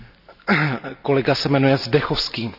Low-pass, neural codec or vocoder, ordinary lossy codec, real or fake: 5.4 kHz; none; none; real